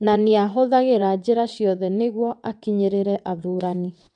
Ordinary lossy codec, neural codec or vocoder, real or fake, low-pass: none; vocoder, 22.05 kHz, 80 mel bands, WaveNeXt; fake; 9.9 kHz